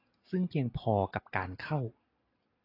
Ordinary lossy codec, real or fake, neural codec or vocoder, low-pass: AAC, 24 kbps; real; none; 5.4 kHz